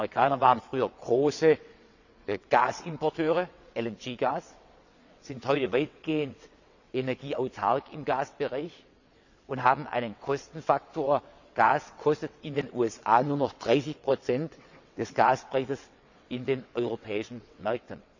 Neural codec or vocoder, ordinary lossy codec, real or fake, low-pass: vocoder, 22.05 kHz, 80 mel bands, WaveNeXt; none; fake; 7.2 kHz